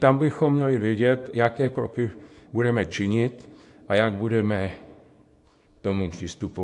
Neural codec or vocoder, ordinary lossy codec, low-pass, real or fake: codec, 24 kHz, 0.9 kbps, WavTokenizer, small release; AAC, 64 kbps; 10.8 kHz; fake